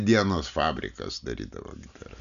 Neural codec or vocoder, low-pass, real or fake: none; 7.2 kHz; real